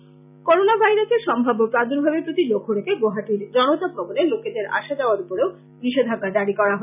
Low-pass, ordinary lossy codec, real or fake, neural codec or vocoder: 3.6 kHz; none; real; none